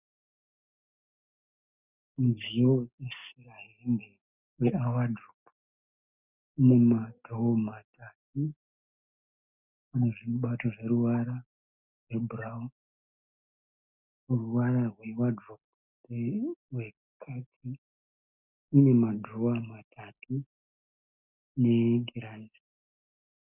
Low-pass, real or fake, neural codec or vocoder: 3.6 kHz; real; none